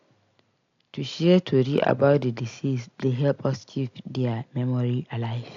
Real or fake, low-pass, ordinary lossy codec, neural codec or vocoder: real; 7.2 kHz; AAC, 48 kbps; none